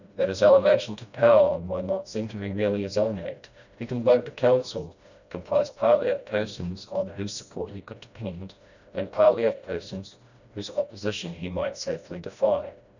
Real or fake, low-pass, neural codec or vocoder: fake; 7.2 kHz; codec, 16 kHz, 1 kbps, FreqCodec, smaller model